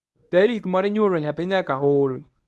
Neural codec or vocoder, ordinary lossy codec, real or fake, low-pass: codec, 24 kHz, 0.9 kbps, WavTokenizer, medium speech release version 1; none; fake; none